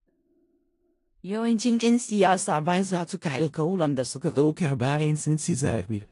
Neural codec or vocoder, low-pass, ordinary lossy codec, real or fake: codec, 16 kHz in and 24 kHz out, 0.4 kbps, LongCat-Audio-Codec, four codebook decoder; 10.8 kHz; AAC, 48 kbps; fake